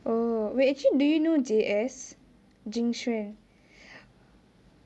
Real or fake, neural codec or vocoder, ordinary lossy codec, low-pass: real; none; none; none